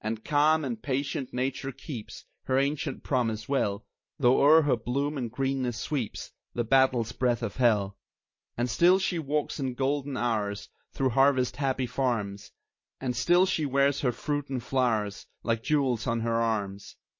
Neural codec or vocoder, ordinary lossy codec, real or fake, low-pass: none; MP3, 32 kbps; real; 7.2 kHz